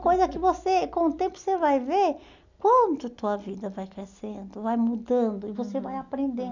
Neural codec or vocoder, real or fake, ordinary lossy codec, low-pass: none; real; none; 7.2 kHz